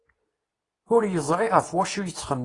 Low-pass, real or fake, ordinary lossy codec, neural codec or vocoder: 10.8 kHz; fake; AAC, 32 kbps; codec, 24 kHz, 0.9 kbps, WavTokenizer, medium speech release version 2